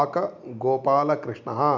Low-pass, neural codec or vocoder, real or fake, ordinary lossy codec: 7.2 kHz; none; real; none